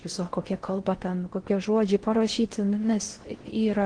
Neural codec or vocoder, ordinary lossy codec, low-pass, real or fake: codec, 16 kHz in and 24 kHz out, 0.6 kbps, FocalCodec, streaming, 4096 codes; Opus, 16 kbps; 9.9 kHz; fake